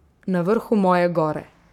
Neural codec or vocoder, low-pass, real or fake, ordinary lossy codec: codec, 44.1 kHz, 7.8 kbps, Pupu-Codec; 19.8 kHz; fake; none